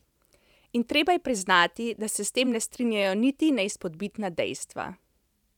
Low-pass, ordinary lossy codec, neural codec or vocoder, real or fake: 19.8 kHz; none; vocoder, 44.1 kHz, 128 mel bands every 512 samples, BigVGAN v2; fake